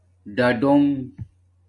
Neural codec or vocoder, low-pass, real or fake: none; 10.8 kHz; real